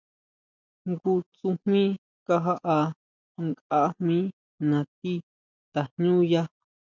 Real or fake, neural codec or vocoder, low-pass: real; none; 7.2 kHz